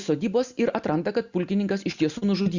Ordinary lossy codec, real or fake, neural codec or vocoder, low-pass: Opus, 64 kbps; real; none; 7.2 kHz